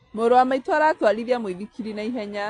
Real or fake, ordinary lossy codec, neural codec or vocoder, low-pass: real; AAC, 48 kbps; none; 9.9 kHz